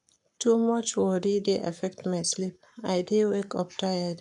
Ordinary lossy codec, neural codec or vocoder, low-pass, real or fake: none; codec, 44.1 kHz, 7.8 kbps, Pupu-Codec; 10.8 kHz; fake